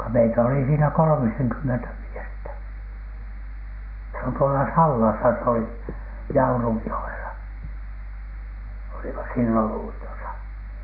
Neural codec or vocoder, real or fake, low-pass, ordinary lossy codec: none; real; 5.4 kHz; none